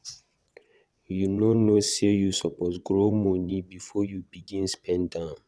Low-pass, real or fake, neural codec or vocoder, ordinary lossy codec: none; fake; vocoder, 22.05 kHz, 80 mel bands, WaveNeXt; none